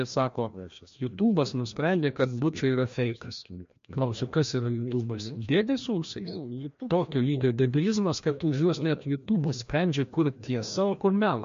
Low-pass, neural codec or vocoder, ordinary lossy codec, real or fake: 7.2 kHz; codec, 16 kHz, 1 kbps, FreqCodec, larger model; MP3, 48 kbps; fake